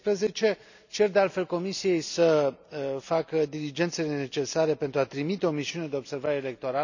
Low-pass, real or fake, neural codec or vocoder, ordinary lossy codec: 7.2 kHz; real; none; none